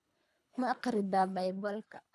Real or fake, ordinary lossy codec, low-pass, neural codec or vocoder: fake; none; none; codec, 24 kHz, 3 kbps, HILCodec